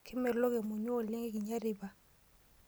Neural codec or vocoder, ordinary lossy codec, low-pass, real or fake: none; none; none; real